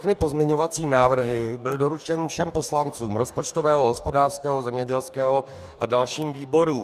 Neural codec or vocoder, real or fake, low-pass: codec, 44.1 kHz, 2.6 kbps, DAC; fake; 14.4 kHz